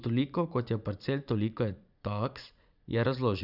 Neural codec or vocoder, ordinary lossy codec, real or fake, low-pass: vocoder, 44.1 kHz, 80 mel bands, Vocos; none; fake; 5.4 kHz